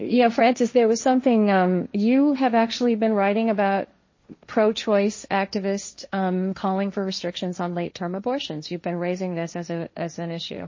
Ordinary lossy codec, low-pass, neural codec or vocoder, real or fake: MP3, 32 kbps; 7.2 kHz; codec, 16 kHz, 1.1 kbps, Voila-Tokenizer; fake